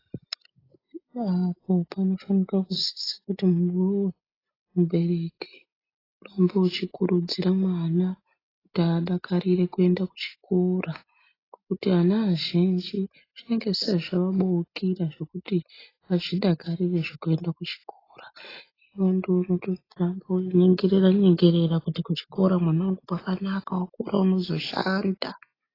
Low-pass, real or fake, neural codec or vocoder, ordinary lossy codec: 5.4 kHz; real; none; AAC, 24 kbps